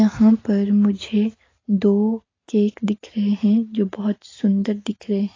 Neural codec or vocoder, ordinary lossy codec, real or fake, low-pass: none; AAC, 32 kbps; real; 7.2 kHz